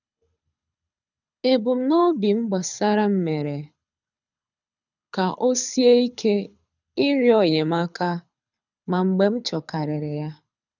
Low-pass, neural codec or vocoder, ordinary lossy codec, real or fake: 7.2 kHz; codec, 24 kHz, 6 kbps, HILCodec; none; fake